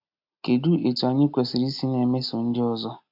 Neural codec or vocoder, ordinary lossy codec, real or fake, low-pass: none; AAC, 48 kbps; real; 5.4 kHz